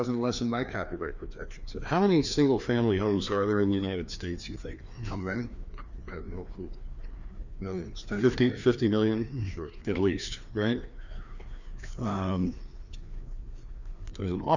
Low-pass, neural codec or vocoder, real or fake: 7.2 kHz; codec, 16 kHz, 2 kbps, FreqCodec, larger model; fake